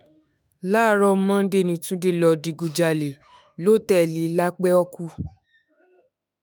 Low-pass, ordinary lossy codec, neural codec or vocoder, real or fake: none; none; autoencoder, 48 kHz, 32 numbers a frame, DAC-VAE, trained on Japanese speech; fake